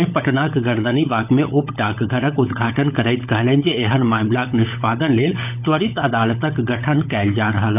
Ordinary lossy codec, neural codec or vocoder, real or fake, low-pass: none; codec, 16 kHz, 16 kbps, FunCodec, trained on LibriTTS, 50 frames a second; fake; 3.6 kHz